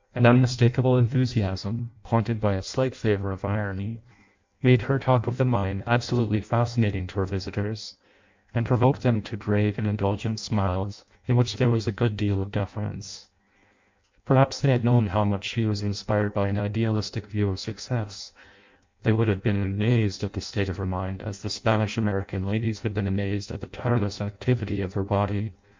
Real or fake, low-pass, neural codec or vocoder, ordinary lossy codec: fake; 7.2 kHz; codec, 16 kHz in and 24 kHz out, 0.6 kbps, FireRedTTS-2 codec; MP3, 64 kbps